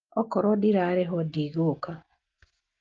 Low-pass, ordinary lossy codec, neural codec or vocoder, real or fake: 7.2 kHz; Opus, 32 kbps; none; real